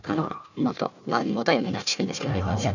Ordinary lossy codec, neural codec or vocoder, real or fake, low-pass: none; codec, 16 kHz, 1 kbps, FunCodec, trained on Chinese and English, 50 frames a second; fake; 7.2 kHz